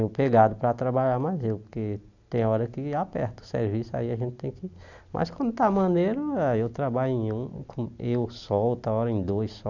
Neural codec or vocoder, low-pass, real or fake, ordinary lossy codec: none; 7.2 kHz; real; none